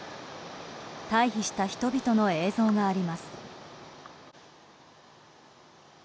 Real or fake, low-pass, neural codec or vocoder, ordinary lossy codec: real; none; none; none